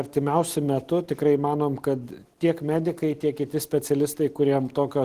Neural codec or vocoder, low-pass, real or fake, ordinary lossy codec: none; 14.4 kHz; real; Opus, 16 kbps